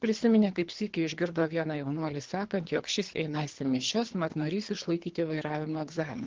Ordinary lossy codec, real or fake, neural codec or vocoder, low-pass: Opus, 16 kbps; fake; codec, 24 kHz, 3 kbps, HILCodec; 7.2 kHz